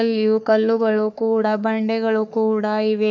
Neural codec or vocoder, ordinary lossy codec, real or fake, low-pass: codec, 44.1 kHz, 3.4 kbps, Pupu-Codec; none; fake; 7.2 kHz